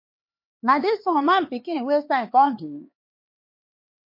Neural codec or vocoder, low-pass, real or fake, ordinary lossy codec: codec, 16 kHz, 4 kbps, X-Codec, HuBERT features, trained on LibriSpeech; 5.4 kHz; fake; MP3, 32 kbps